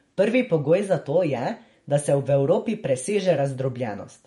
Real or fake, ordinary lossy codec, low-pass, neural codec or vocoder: fake; MP3, 48 kbps; 19.8 kHz; vocoder, 48 kHz, 128 mel bands, Vocos